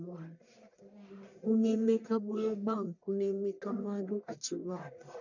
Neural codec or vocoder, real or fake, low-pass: codec, 44.1 kHz, 1.7 kbps, Pupu-Codec; fake; 7.2 kHz